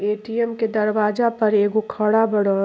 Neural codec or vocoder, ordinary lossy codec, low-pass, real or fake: none; none; none; real